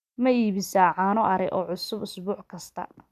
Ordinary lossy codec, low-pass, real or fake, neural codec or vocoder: none; 14.4 kHz; real; none